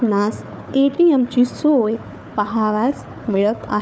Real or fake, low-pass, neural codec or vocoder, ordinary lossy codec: fake; none; codec, 16 kHz, 4 kbps, FunCodec, trained on Chinese and English, 50 frames a second; none